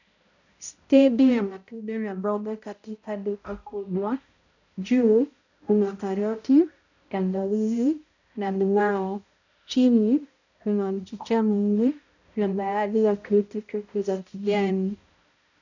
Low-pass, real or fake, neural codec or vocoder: 7.2 kHz; fake; codec, 16 kHz, 0.5 kbps, X-Codec, HuBERT features, trained on balanced general audio